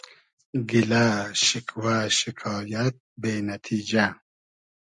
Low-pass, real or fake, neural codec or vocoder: 10.8 kHz; real; none